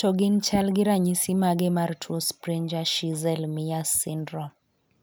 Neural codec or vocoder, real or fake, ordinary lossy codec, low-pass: none; real; none; none